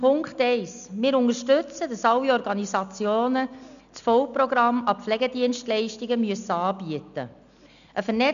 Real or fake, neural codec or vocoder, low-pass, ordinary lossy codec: real; none; 7.2 kHz; none